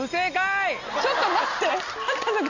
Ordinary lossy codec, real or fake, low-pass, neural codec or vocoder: none; real; 7.2 kHz; none